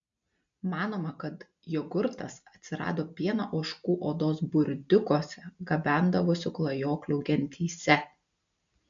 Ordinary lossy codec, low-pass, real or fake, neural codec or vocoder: MP3, 64 kbps; 7.2 kHz; real; none